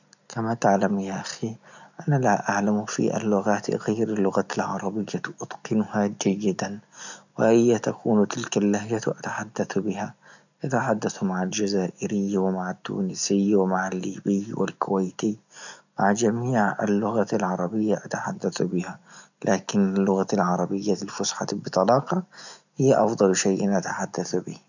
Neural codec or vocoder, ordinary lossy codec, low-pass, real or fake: none; none; 7.2 kHz; real